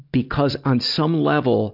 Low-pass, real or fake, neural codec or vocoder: 5.4 kHz; real; none